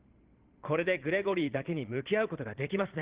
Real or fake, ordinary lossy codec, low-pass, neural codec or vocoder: fake; Opus, 16 kbps; 3.6 kHz; vocoder, 22.05 kHz, 80 mel bands, Vocos